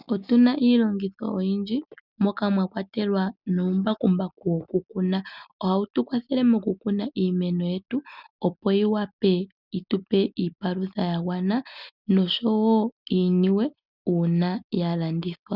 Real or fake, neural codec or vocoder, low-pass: real; none; 5.4 kHz